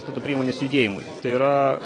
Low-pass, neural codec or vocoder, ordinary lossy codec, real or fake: 9.9 kHz; vocoder, 22.05 kHz, 80 mel bands, WaveNeXt; AAC, 32 kbps; fake